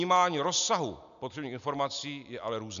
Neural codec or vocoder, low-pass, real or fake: none; 7.2 kHz; real